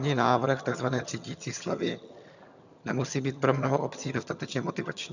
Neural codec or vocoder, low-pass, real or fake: vocoder, 22.05 kHz, 80 mel bands, HiFi-GAN; 7.2 kHz; fake